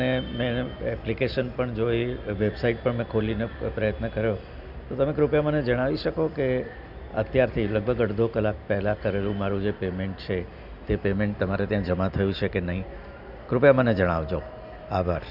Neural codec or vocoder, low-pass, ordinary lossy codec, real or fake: none; 5.4 kHz; none; real